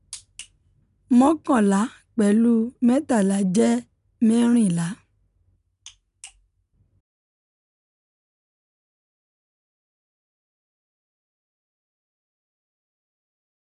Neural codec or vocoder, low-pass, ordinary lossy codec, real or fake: none; 10.8 kHz; none; real